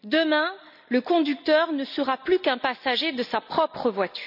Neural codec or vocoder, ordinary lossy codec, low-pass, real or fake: none; none; 5.4 kHz; real